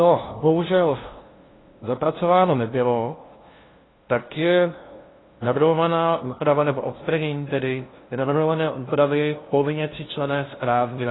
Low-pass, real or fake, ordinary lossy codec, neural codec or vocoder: 7.2 kHz; fake; AAC, 16 kbps; codec, 16 kHz, 0.5 kbps, FunCodec, trained on LibriTTS, 25 frames a second